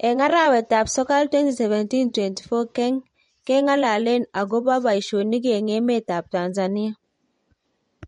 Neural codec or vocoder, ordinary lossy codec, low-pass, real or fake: vocoder, 24 kHz, 100 mel bands, Vocos; MP3, 48 kbps; 10.8 kHz; fake